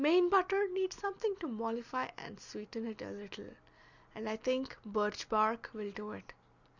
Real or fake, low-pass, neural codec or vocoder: real; 7.2 kHz; none